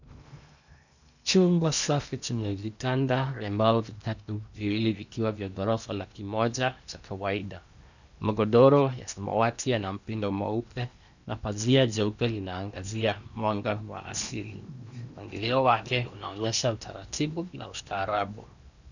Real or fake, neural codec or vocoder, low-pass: fake; codec, 16 kHz in and 24 kHz out, 0.8 kbps, FocalCodec, streaming, 65536 codes; 7.2 kHz